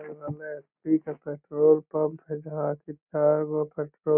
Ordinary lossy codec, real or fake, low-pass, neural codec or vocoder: none; real; 3.6 kHz; none